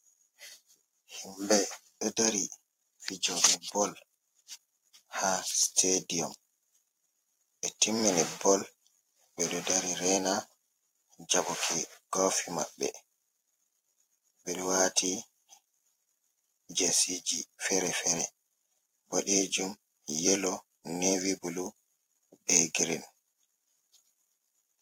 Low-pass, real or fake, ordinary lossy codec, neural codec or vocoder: 19.8 kHz; fake; AAC, 48 kbps; vocoder, 44.1 kHz, 128 mel bands every 256 samples, BigVGAN v2